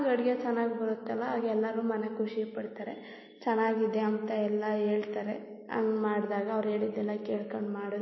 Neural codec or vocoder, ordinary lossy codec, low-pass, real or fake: none; MP3, 24 kbps; 7.2 kHz; real